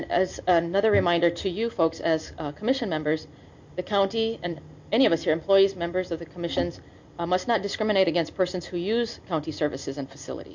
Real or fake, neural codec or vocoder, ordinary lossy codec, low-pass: real; none; MP3, 48 kbps; 7.2 kHz